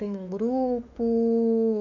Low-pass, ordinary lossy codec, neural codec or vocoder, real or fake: 7.2 kHz; none; autoencoder, 48 kHz, 32 numbers a frame, DAC-VAE, trained on Japanese speech; fake